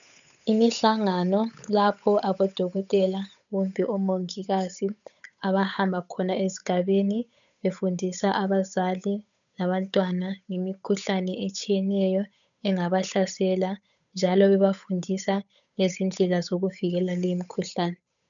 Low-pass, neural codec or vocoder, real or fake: 7.2 kHz; codec, 16 kHz, 8 kbps, FunCodec, trained on Chinese and English, 25 frames a second; fake